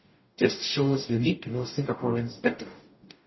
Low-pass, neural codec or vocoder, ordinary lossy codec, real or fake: 7.2 kHz; codec, 44.1 kHz, 0.9 kbps, DAC; MP3, 24 kbps; fake